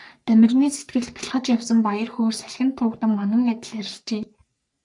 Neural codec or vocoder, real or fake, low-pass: codec, 44.1 kHz, 3.4 kbps, Pupu-Codec; fake; 10.8 kHz